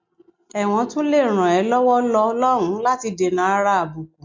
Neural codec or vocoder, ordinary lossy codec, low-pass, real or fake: none; MP3, 48 kbps; 7.2 kHz; real